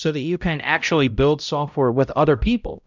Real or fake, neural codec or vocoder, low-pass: fake; codec, 16 kHz, 0.5 kbps, X-Codec, HuBERT features, trained on balanced general audio; 7.2 kHz